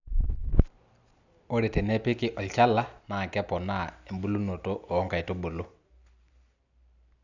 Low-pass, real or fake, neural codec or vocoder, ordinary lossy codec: 7.2 kHz; real; none; none